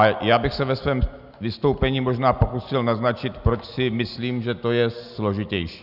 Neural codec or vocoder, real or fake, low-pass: none; real; 5.4 kHz